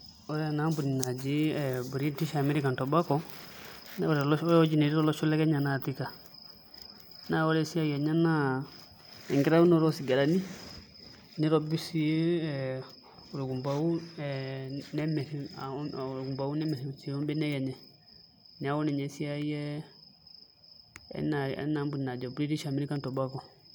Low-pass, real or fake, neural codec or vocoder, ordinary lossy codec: none; real; none; none